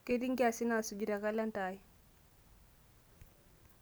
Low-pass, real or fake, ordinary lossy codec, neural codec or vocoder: none; real; none; none